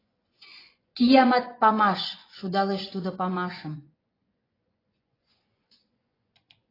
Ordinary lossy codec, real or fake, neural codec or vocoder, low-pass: AAC, 24 kbps; fake; vocoder, 24 kHz, 100 mel bands, Vocos; 5.4 kHz